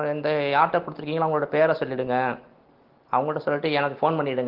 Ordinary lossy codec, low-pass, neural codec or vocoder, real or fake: Opus, 16 kbps; 5.4 kHz; none; real